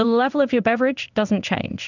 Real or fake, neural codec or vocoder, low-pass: fake; codec, 16 kHz in and 24 kHz out, 1 kbps, XY-Tokenizer; 7.2 kHz